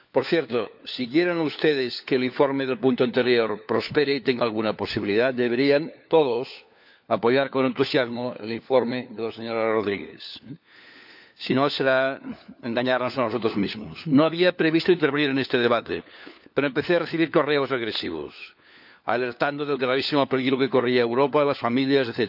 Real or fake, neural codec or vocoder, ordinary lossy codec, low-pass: fake; codec, 16 kHz, 4 kbps, FunCodec, trained on LibriTTS, 50 frames a second; none; 5.4 kHz